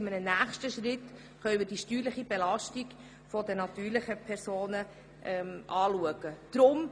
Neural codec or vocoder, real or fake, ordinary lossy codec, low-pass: none; real; none; none